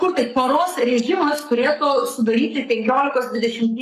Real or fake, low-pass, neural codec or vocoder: fake; 14.4 kHz; codec, 44.1 kHz, 7.8 kbps, Pupu-Codec